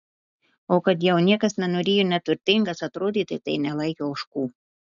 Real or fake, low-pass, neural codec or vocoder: real; 7.2 kHz; none